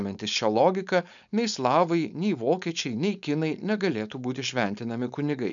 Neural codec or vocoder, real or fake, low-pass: codec, 16 kHz, 4.8 kbps, FACodec; fake; 7.2 kHz